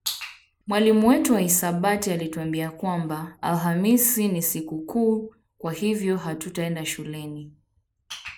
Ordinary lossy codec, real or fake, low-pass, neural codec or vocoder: none; real; none; none